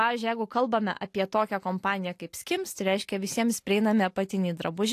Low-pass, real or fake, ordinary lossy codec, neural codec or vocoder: 14.4 kHz; real; AAC, 64 kbps; none